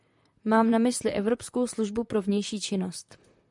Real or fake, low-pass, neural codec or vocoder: fake; 10.8 kHz; vocoder, 44.1 kHz, 128 mel bands, Pupu-Vocoder